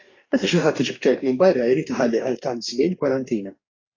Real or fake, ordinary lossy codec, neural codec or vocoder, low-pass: fake; AAC, 32 kbps; codec, 44.1 kHz, 2.6 kbps, DAC; 7.2 kHz